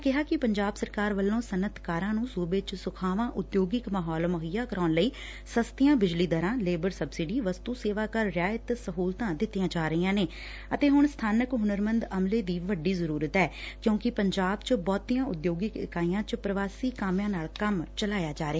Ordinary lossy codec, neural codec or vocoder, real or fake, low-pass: none; none; real; none